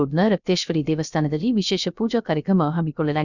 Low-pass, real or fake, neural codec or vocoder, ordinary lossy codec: 7.2 kHz; fake; codec, 16 kHz, 0.3 kbps, FocalCodec; none